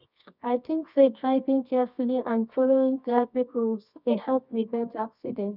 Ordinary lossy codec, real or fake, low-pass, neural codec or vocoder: none; fake; 5.4 kHz; codec, 24 kHz, 0.9 kbps, WavTokenizer, medium music audio release